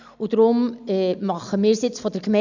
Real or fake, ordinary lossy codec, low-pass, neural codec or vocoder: real; none; 7.2 kHz; none